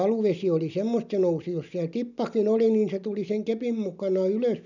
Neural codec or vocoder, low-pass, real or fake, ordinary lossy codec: none; 7.2 kHz; real; none